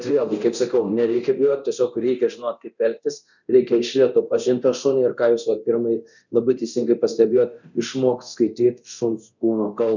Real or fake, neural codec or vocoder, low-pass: fake; codec, 24 kHz, 0.9 kbps, DualCodec; 7.2 kHz